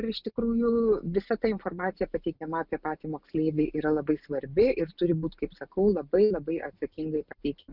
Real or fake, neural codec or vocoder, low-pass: real; none; 5.4 kHz